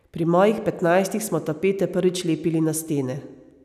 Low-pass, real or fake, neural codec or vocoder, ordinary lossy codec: 14.4 kHz; real; none; none